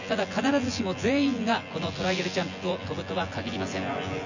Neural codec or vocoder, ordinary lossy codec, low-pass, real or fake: vocoder, 24 kHz, 100 mel bands, Vocos; none; 7.2 kHz; fake